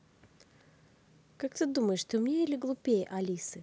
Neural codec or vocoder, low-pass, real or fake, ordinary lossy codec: none; none; real; none